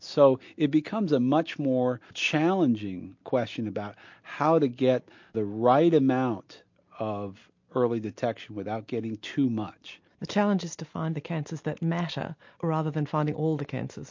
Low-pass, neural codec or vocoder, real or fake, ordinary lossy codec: 7.2 kHz; none; real; MP3, 48 kbps